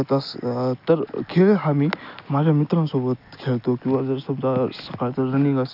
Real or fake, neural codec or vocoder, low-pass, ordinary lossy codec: real; none; 5.4 kHz; none